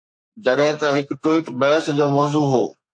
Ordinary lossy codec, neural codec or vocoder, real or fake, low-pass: AAC, 64 kbps; codec, 32 kHz, 1.9 kbps, SNAC; fake; 9.9 kHz